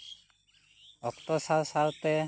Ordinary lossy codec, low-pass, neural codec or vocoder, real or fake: none; none; none; real